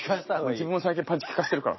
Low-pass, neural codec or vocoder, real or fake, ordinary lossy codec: 7.2 kHz; none; real; MP3, 24 kbps